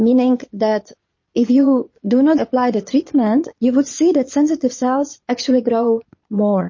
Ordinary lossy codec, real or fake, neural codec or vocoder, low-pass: MP3, 32 kbps; fake; vocoder, 22.05 kHz, 80 mel bands, Vocos; 7.2 kHz